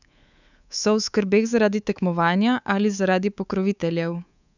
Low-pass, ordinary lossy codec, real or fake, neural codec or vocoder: 7.2 kHz; none; fake; codec, 24 kHz, 3.1 kbps, DualCodec